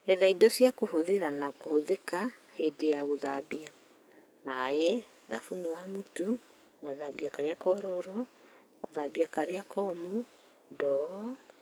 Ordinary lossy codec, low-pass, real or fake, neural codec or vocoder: none; none; fake; codec, 44.1 kHz, 2.6 kbps, SNAC